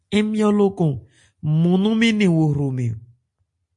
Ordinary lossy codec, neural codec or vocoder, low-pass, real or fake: MP3, 48 kbps; none; 10.8 kHz; real